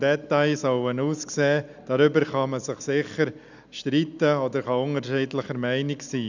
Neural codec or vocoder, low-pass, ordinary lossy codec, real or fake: none; 7.2 kHz; none; real